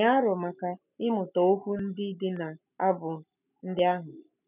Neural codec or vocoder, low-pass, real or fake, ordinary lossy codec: none; 3.6 kHz; real; none